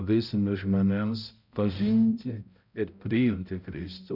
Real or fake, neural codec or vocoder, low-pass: fake; codec, 16 kHz, 0.5 kbps, X-Codec, HuBERT features, trained on balanced general audio; 5.4 kHz